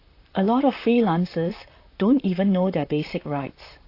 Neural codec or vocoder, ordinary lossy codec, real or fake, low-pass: vocoder, 44.1 kHz, 128 mel bands, Pupu-Vocoder; AAC, 32 kbps; fake; 5.4 kHz